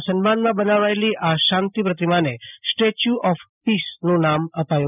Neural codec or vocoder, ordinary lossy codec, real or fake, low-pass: none; none; real; 3.6 kHz